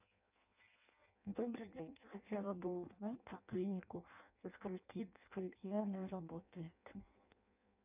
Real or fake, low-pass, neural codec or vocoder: fake; 3.6 kHz; codec, 16 kHz in and 24 kHz out, 0.6 kbps, FireRedTTS-2 codec